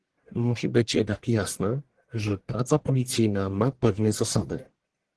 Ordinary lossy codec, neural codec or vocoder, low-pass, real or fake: Opus, 16 kbps; codec, 44.1 kHz, 1.7 kbps, Pupu-Codec; 10.8 kHz; fake